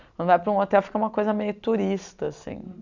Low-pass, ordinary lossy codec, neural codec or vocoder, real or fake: 7.2 kHz; none; none; real